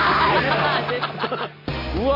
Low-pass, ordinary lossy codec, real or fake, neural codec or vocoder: 5.4 kHz; none; real; none